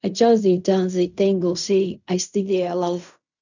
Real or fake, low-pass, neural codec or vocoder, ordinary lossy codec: fake; 7.2 kHz; codec, 16 kHz in and 24 kHz out, 0.4 kbps, LongCat-Audio-Codec, fine tuned four codebook decoder; none